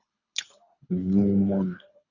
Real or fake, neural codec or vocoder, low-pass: fake; codec, 24 kHz, 3 kbps, HILCodec; 7.2 kHz